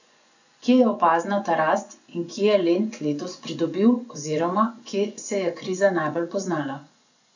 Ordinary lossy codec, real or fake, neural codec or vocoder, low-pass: none; fake; vocoder, 24 kHz, 100 mel bands, Vocos; 7.2 kHz